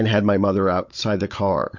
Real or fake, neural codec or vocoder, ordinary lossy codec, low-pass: fake; codec, 16 kHz, 16 kbps, FunCodec, trained on Chinese and English, 50 frames a second; MP3, 48 kbps; 7.2 kHz